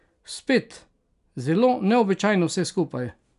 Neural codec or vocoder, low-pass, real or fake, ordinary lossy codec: none; 10.8 kHz; real; none